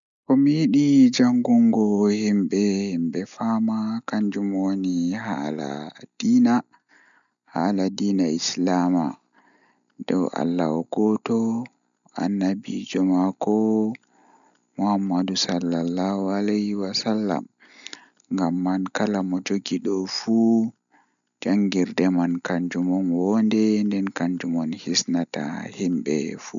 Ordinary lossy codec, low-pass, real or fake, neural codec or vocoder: none; 7.2 kHz; real; none